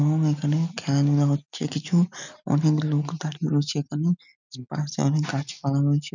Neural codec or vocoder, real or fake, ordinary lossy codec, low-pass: none; real; none; 7.2 kHz